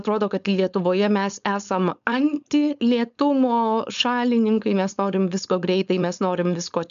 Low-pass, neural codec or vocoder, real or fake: 7.2 kHz; codec, 16 kHz, 4.8 kbps, FACodec; fake